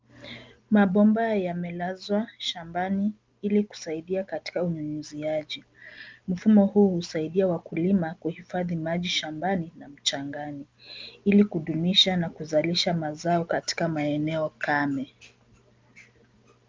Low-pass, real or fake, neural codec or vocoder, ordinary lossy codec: 7.2 kHz; real; none; Opus, 24 kbps